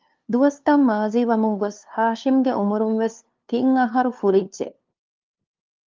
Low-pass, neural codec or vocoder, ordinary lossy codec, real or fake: 7.2 kHz; codec, 16 kHz, 2 kbps, FunCodec, trained on LibriTTS, 25 frames a second; Opus, 24 kbps; fake